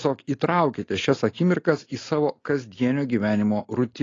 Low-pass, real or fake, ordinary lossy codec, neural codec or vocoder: 7.2 kHz; real; AAC, 32 kbps; none